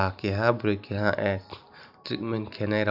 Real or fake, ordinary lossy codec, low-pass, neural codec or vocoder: fake; AAC, 48 kbps; 5.4 kHz; vocoder, 44.1 kHz, 128 mel bands every 512 samples, BigVGAN v2